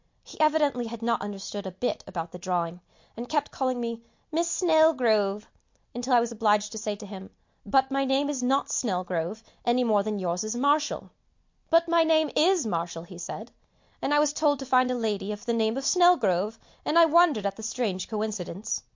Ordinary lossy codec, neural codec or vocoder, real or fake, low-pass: MP3, 48 kbps; none; real; 7.2 kHz